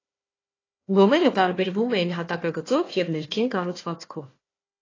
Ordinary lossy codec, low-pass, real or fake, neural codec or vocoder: AAC, 32 kbps; 7.2 kHz; fake; codec, 16 kHz, 1 kbps, FunCodec, trained on Chinese and English, 50 frames a second